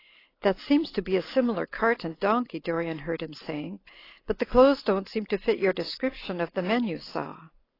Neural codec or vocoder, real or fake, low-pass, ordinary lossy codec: none; real; 5.4 kHz; AAC, 24 kbps